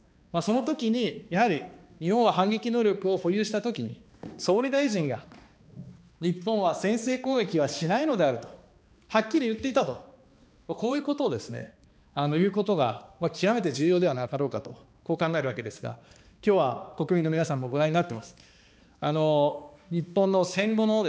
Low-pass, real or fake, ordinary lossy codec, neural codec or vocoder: none; fake; none; codec, 16 kHz, 2 kbps, X-Codec, HuBERT features, trained on balanced general audio